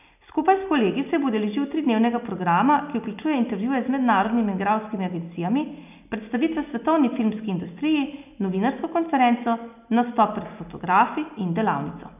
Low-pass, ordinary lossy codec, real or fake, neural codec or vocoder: 3.6 kHz; none; real; none